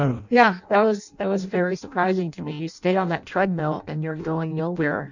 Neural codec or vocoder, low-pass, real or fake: codec, 16 kHz in and 24 kHz out, 0.6 kbps, FireRedTTS-2 codec; 7.2 kHz; fake